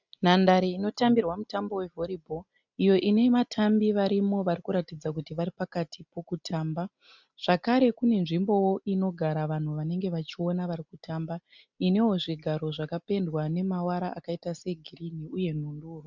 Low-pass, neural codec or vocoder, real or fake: 7.2 kHz; none; real